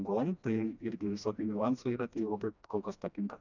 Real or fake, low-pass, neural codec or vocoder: fake; 7.2 kHz; codec, 16 kHz, 1 kbps, FreqCodec, smaller model